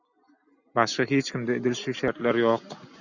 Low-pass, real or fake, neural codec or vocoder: 7.2 kHz; real; none